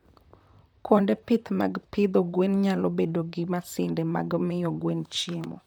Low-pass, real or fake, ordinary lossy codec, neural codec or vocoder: 19.8 kHz; fake; none; vocoder, 44.1 kHz, 128 mel bands, Pupu-Vocoder